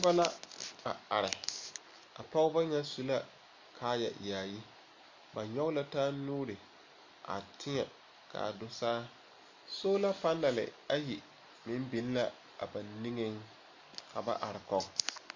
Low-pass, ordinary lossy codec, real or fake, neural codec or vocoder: 7.2 kHz; MP3, 64 kbps; real; none